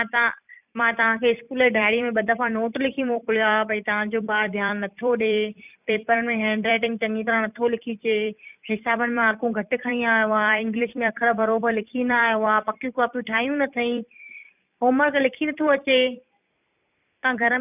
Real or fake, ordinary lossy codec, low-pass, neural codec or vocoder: fake; none; 3.6 kHz; vocoder, 44.1 kHz, 128 mel bands every 256 samples, BigVGAN v2